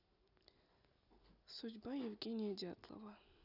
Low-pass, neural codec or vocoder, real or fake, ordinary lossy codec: 5.4 kHz; none; real; none